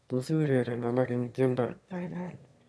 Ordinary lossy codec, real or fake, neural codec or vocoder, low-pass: none; fake; autoencoder, 22.05 kHz, a latent of 192 numbers a frame, VITS, trained on one speaker; none